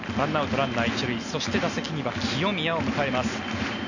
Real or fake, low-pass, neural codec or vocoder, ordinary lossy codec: real; 7.2 kHz; none; none